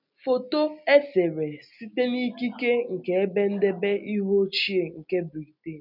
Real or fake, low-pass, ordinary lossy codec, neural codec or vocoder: real; 5.4 kHz; none; none